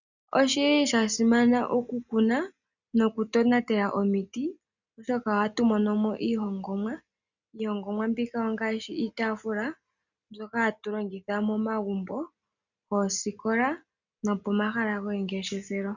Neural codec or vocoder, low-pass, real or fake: none; 7.2 kHz; real